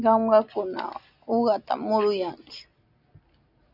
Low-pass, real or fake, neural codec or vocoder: 5.4 kHz; real; none